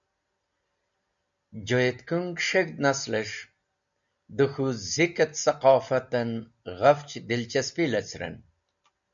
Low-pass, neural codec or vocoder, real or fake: 7.2 kHz; none; real